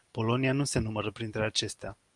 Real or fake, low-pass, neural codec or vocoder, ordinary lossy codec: real; 10.8 kHz; none; Opus, 32 kbps